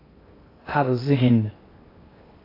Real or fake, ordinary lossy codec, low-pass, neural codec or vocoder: fake; AAC, 24 kbps; 5.4 kHz; codec, 16 kHz in and 24 kHz out, 0.6 kbps, FocalCodec, streaming, 2048 codes